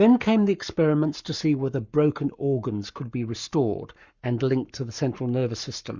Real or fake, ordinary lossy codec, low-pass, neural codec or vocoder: fake; Opus, 64 kbps; 7.2 kHz; codec, 44.1 kHz, 7.8 kbps, Pupu-Codec